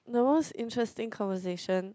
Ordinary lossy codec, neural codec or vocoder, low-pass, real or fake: none; none; none; real